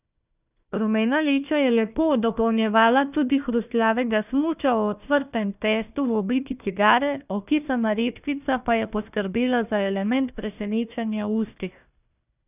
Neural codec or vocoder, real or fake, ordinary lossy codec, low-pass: codec, 16 kHz, 1 kbps, FunCodec, trained on Chinese and English, 50 frames a second; fake; none; 3.6 kHz